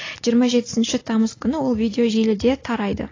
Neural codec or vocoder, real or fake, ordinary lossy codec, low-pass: none; real; AAC, 32 kbps; 7.2 kHz